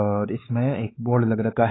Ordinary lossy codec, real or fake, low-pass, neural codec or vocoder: AAC, 16 kbps; fake; 7.2 kHz; codec, 16 kHz, 4 kbps, X-Codec, WavLM features, trained on Multilingual LibriSpeech